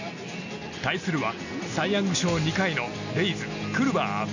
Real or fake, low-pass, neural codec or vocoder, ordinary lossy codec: real; 7.2 kHz; none; none